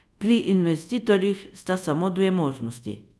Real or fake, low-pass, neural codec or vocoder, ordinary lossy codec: fake; none; codec, 24 kHz, 0.5 kbps, DualCodec; none